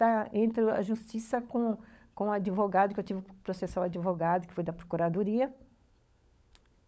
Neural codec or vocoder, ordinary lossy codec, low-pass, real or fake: codec, 16 kHz, 16 kbps, FunCodec, trained on LibriTTS, 50 frames a second; none; none; fake